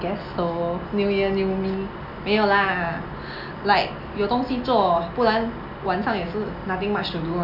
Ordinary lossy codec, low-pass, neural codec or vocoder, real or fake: none; 5.4 kHz; none; real